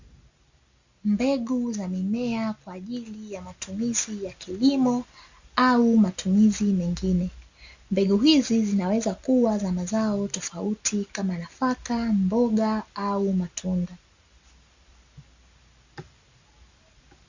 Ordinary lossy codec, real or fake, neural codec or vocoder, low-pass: Opus, 64 kbps; real; none; 7.2 kHz